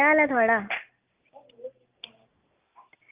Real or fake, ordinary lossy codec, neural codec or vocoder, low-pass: real; Opus, 32 kbps; none; 3.6 kHz